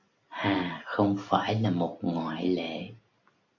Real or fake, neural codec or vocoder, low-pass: real; none; 7.2 kHz